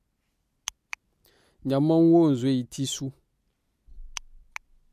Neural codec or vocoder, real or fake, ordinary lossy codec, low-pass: none; real; MP3, 64 kbps; 14.4 kHz